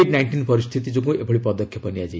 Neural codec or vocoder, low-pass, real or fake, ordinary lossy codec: none; none; real; none